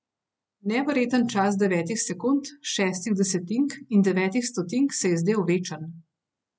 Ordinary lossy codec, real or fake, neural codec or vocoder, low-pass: none; real; none; none